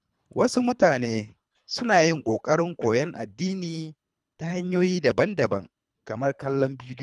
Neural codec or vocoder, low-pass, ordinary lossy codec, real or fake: codec, 24 kHz, 3 kbps, HILCodec; none; none; fake